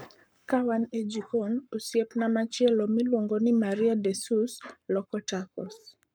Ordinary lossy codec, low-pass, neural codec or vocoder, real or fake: none; none; codec, 44.1 kHz, 7.8 kbps, Pupu-Codec; fake